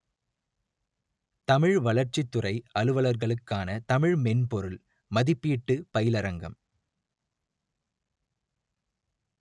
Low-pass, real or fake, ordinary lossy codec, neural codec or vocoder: 10.8 kHz; real; none; none